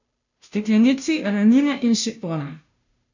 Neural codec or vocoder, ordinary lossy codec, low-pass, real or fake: codec, 16 kHz, 0.5 kbps, FunCodec, trained on Chinese and English, 25 frames a second; none; 7.2 kHz; fake